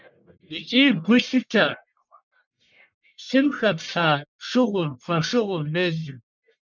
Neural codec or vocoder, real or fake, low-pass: codec, 44.1 kHz, 1.7 kbps, Pupu-Codec; fake; 7.2 kHz